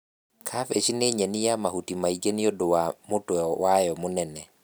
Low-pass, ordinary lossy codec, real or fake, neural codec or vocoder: none; none; real; none